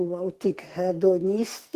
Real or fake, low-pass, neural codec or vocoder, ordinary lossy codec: fake; 14.4 kHz; codec, 44.1 kHz, 2.6 kbps, SNAC; Opus, 16 kbps